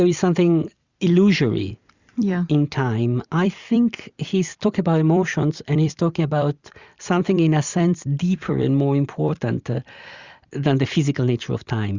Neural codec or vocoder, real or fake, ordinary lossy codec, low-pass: vocoder, 44.1 kHz, 128 mel bands every 256 samples, BigVGAN v2; fake; Opus, 64 kbps; 7.2 kHz